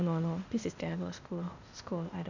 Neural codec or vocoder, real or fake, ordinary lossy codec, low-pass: codec, 16 kHz, 0.8 kbps, ZipCodec; fake; none; 7.2 kHz